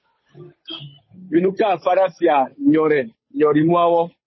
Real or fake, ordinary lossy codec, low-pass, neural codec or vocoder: fake; MP3, 24 kbps; 7.2 kHz; codec, 16 kHz, 8 kbps, FunCodec, trained on Chinese and English, 25 frames a second